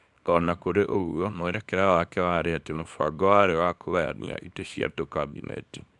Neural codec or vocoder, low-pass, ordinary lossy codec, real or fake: codec, 24 kHz, 0.9 kbps, WavTokenizer, small release; 10.8 kHz; none; fake